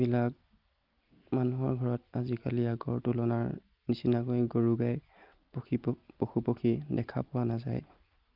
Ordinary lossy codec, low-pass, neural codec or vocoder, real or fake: Opus, 32 kbps; 5.4 kHz; none; real